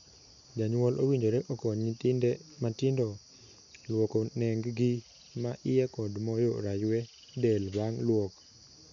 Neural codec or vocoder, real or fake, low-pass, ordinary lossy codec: none; real; 7.2 kHz; none